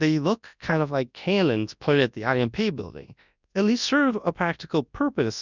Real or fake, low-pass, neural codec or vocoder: fake; 7.2 kHz; codec, 24 kHz, 0.9 kbps, WavTokenizer, large speech release